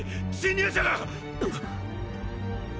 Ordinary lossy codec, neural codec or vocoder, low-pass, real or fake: none; none; none; real